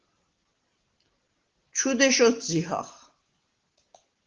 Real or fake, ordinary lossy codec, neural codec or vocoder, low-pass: real; Opus, 16 kbps; none; 7.2 kHz